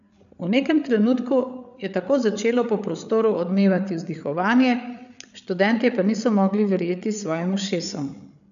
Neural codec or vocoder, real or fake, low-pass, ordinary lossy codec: codec, 16 kHz, 8 kbps, FreqCodec, larger model; fake; 7.2 kHz; none